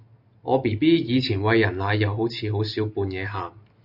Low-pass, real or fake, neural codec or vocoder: 5.4 kHz; real; none